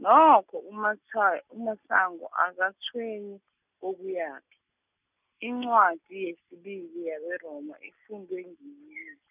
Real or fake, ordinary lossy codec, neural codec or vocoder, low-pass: real; none; none; 3.6 kHz